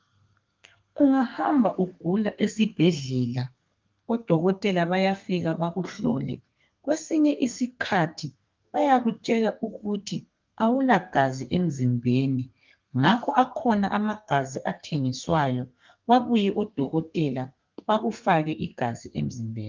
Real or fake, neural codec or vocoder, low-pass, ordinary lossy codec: fake; codec, 32 kHz, 1.9 kbps, SNAC; 7.2 kHz; Opus, 24 kbps